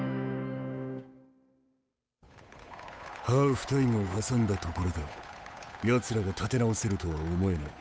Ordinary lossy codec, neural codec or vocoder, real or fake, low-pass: none; codec, 16 kHz, 8 kbps, FunCodec, trained on Chinese and English, 25 frames a second; fake; none